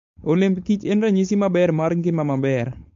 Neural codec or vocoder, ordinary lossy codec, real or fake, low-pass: codec, 16 kHz, 4.8 kbps, FACodec; MP3, 48 kbps; fake; 7.2 kHz